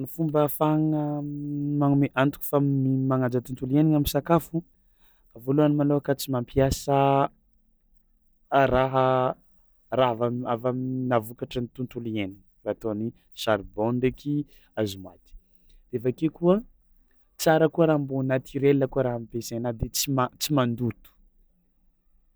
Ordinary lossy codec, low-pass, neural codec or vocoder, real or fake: none; none; none; real